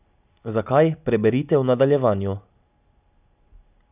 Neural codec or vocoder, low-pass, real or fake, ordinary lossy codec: none; 3.6 kHz; real; none